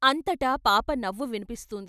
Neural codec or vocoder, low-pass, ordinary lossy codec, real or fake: none; 14.4 kHz; none; real